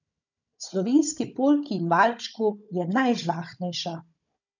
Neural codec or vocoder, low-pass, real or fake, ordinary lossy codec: codec, 16 kHz, 16 kbps, FunCodec, trained on Chinese and English, 50 frames a second; 7.2 kHz; fake; none